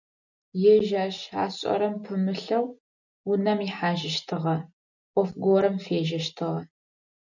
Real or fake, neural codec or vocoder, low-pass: real; none; 7.2 kHz